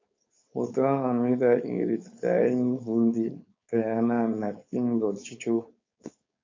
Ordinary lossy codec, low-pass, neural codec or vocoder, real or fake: MP3, 48 kbps; 7.2 kHz; codec, 16 kHz, 4.8 kbps, FACodec; fake